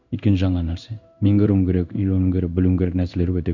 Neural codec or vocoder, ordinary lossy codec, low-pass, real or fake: codec, 16 kHz in and 24 kHz out, 1 kbps, XY-Tokenizer; none; 7.2 kHz; fake